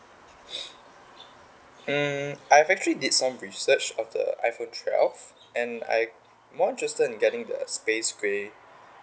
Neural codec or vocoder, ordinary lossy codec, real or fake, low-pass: none; none; real; none